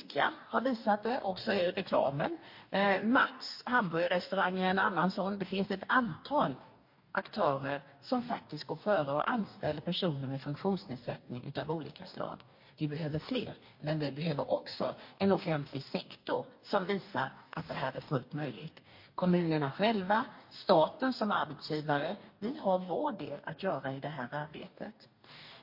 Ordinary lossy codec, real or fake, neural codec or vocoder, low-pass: MP3, 32 kbps; fake; codec, 44.1 kHz, 2.6 kbps, DAC; 5.4 kHz